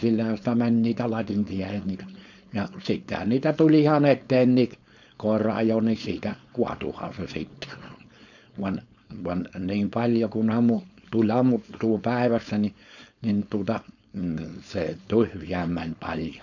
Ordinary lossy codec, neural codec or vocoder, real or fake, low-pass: none; codec, 16 kHz, 4.8 kbps, FACodec; fake; 7.2 kHz